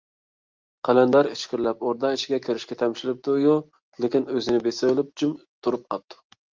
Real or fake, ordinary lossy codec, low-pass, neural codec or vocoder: real; Opus, 32 kbps; 7.2 kHz; none